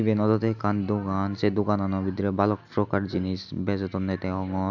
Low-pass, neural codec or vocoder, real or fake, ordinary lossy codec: 7.2 kHz; none; real; none